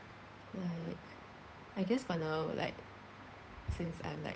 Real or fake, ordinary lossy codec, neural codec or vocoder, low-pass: fake; none; codec, 16 kHz, 8 kbps, FunCodec, trained on Chinese and English, 25 frames a second; none